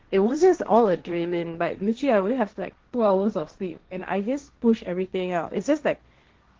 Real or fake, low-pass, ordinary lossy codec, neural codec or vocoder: fake; 7.2 kHz; Opus, 16 kbps; codec, 16 kHz, 1.1 kbps, Voila-Tokenizer